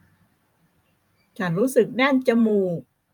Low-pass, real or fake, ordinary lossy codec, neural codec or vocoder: 19.8 kHz; fake; none; vocoder, 44.1 kHz, 128 mel bands every 512 samples, BigVGAN v2